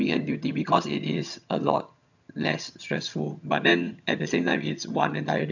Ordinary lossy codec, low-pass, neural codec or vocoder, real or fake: none; 7.2 kHz; vocoder, 22.05 kHz, 80 mel bands, HiFi-GAN; fake